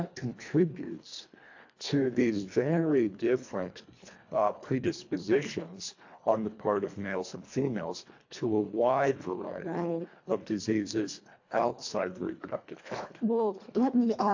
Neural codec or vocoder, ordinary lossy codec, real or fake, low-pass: codec, 24 kHz, 1.5 kbps, HILCodec; AAC, 48 kbps; fake; 7.2 kHz